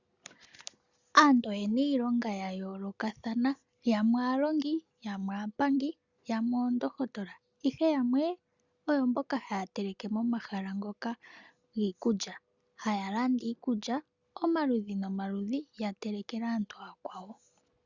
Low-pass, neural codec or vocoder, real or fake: 7.2 kHz; none; real